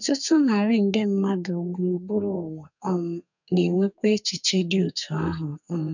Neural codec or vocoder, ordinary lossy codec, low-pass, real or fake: codec, 32 kHz, 1.9 kbps, SNAC; none; 7.2 kHz; fake